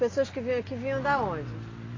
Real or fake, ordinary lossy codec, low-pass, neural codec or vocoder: real; AAC, 32 kbps; 7.2 kHz; none